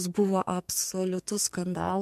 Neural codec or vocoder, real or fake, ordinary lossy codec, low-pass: codec, 44.1 kHz, 2.6 kbps, SNAC; fake; MP3, 64 kbps; 14.4 kHz